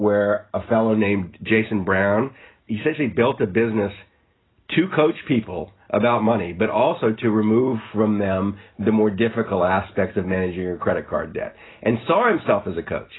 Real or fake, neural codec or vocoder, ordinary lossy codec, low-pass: real; none; AAC, 16 kbps; 7.2 kHz